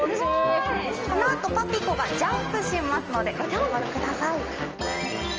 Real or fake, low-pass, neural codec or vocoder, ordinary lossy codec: real; 7.2 kHz; none; Opus, 24 kbps